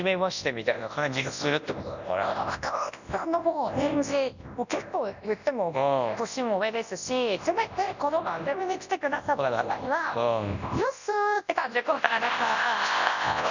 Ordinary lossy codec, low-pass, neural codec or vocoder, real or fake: none; 7.2 kHz; codec, 24 kHz, 0.9 kbps, WavTokenizer, large speech release; fake